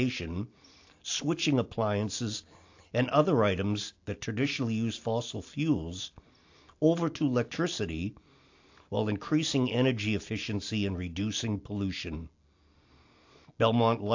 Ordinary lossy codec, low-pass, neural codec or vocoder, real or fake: AAC, 48 kbps; 7.2 kHz; none; real